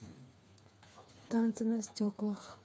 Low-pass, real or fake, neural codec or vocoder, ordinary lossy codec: none; fake; codec, 16 kHz, 4 kbps, FreqCodec, smaller model; none